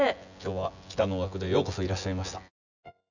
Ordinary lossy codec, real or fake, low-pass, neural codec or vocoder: none; fake; 7.2 kHz; vocoder, 24 kHz, 100 mel bands, Vocos